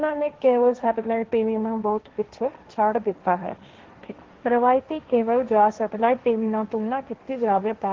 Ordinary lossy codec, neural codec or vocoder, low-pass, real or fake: Opus, 16 kbps; codec, 16 kHz, 1.1 kbps, Voila-Tokenizer; 7.2 kHz; fake